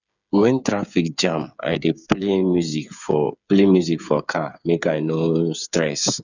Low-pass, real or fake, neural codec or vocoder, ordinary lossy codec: 7.2 kHz; fake; codec, 16 kHz, 8 kbps, FreqCodec, smaller model; none